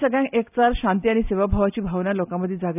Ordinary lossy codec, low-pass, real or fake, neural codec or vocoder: none; 3.6 kHz; real; none